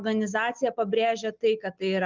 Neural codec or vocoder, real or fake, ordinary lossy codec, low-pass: none; real; Opus, 32 kbps; 7.2 kHz